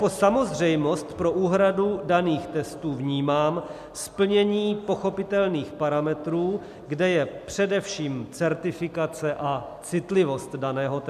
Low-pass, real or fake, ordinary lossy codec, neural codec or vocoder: 14.4 kHz; real; MP3, 96 kbps; none